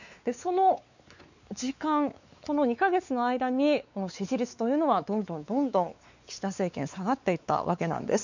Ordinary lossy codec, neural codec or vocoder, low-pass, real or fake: none; codec, 16 kHz, 4 kbps, X-Codec, WavLM features, trained on Multilingual LibriSpeech; 7.2 kHz; fake